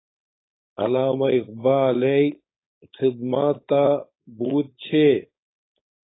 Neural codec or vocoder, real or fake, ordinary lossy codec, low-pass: codec, 16 kHz, 4.8 kbps, FACodec; fake; AAC, 16 kbps; 7.2 kHz